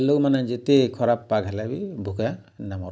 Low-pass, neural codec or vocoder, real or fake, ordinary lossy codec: none; none; real; none